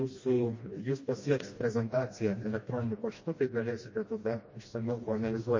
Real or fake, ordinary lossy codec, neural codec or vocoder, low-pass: fake; MP3, 32 kbps; codec, 16 kHz, 1 kbps, FreqCodec, smaller model; 7.2 kHz